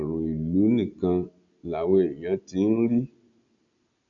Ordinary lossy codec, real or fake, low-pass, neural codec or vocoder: none; real; 7.2 kHz; none